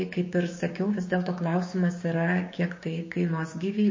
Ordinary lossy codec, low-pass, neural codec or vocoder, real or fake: MP3, 32 kbps; 7.2 kHz; codec, 16 kHz, 6 kbps, DAC; fake